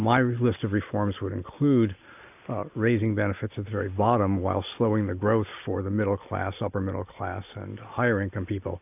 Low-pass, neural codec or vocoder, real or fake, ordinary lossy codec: 3.6 kHz; none; real; AAC, 32 kbps